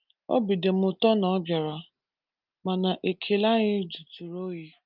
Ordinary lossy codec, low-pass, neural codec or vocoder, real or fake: Opus, 24 kbps; 5.4 kHz; none; real